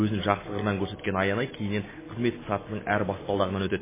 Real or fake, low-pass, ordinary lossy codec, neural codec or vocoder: real; 3.6 kHz; MP3, 16 kbps; none